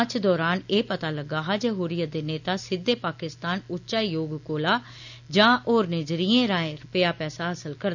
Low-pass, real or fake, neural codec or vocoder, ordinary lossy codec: 7.2 kHz; real; none; none